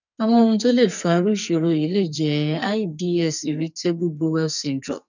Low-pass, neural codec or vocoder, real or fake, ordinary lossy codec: 7.2 kHz; codec, 44.1 kHz, 2.6 kbps, SNAC; fake; none